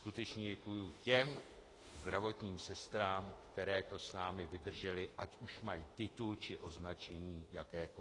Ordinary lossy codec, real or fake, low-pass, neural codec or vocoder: AAC, 32 kbps; fake; 10.8 kHz; autoencoder, 48 kHz, 32 numbers a frame, DAC-VAE, trained on Japanese speech